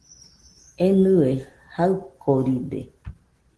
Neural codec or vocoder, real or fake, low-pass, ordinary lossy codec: none; real; 10.8 kHz; Opus, 16 kbps